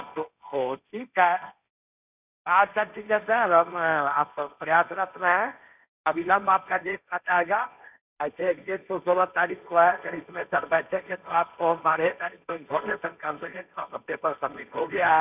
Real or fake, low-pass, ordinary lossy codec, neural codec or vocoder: fake; 3.6 kHz; none; codec, 16 kHz, 1.1 kbps, Voila-Tokenizer